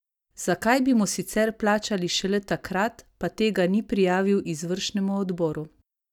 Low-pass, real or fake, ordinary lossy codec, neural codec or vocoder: 19.8 kHz; real; none; none